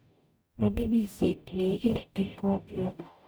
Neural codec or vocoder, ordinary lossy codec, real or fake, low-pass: codec, 44.1 kHz, 0.9 kbps, DAC; none; fake; none